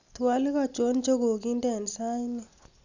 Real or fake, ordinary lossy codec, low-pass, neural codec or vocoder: real; none; 7.2 kHz; none